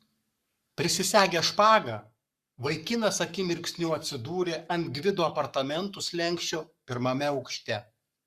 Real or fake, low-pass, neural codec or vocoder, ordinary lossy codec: fake; 14.4 kHz; codec, 44.1 kHz, 7.8 kbps, Pupu-Codec; Opus, 64 kbps